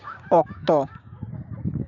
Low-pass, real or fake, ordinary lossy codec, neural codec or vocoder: 7.2 kHz; fake; none; codec, 16 kHz, 6 kbps, DAC